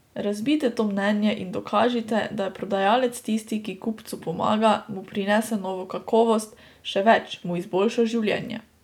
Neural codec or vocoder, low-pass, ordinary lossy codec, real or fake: none; 19.8 kHz; none; real